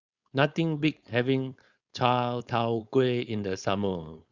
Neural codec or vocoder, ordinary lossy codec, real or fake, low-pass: codec, 16 kHz, 4.8 kbps, FACodec; Opus, 64 kbps; fake; 7.2 kHz